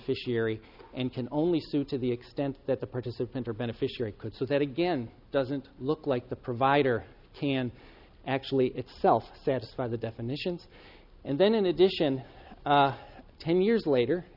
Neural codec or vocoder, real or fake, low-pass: none; real; 5.4 kHz